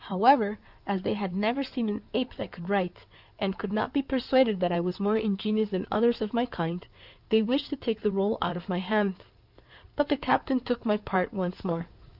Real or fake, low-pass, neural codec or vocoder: fake; 5.4 kHz; codec, 16 kHz in and 24 kHz out, 2.2 kbps, FireRedTTS-2 codec